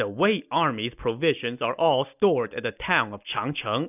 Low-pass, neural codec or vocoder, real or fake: 3.6 kHz; none; real